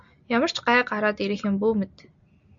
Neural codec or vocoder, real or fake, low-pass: none; real; 7.2 kHz